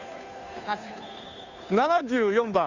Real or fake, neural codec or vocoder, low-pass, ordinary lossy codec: fake; codec, 16 kHz, 2 kbps, FunCodec, trained on Chinese and English, 25 frames a second; 7.2 kHz; none